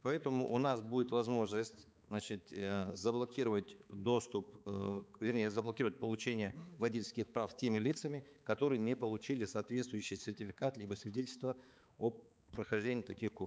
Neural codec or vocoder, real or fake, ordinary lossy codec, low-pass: codec, 16 kHz, 4 kbps, X-Codec, HuBERT features, trained on balanced general audio; fake; none; none